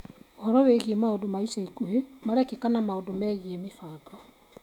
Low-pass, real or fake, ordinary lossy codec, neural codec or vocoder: 19.8 kHz; fake; none; autoencoder, 48 kHz, 128 numbers a frame, DAC-VAE, trained on Japanese speech